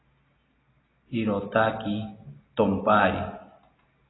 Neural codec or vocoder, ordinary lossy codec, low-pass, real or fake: none; AAC, 16 kbps; 7.2 kHz; real